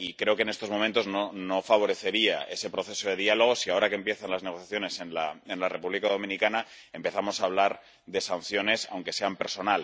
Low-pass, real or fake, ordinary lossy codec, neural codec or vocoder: none; real; none; none